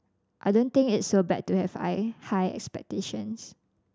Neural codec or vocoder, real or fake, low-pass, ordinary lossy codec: none; real; none; none